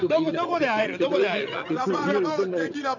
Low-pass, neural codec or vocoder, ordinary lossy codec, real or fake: 7.2 kHz; vocoder, 22.05 kHz, 80 mel bands, WaveNeXt; none; fake